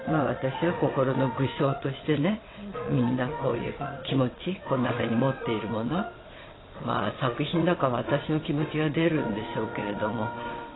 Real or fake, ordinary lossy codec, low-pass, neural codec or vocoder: fake; AAC, 16 kbps; 7.2 kHz; vocoder, 22.05 kHz, 80 mel bands, WaveNeXt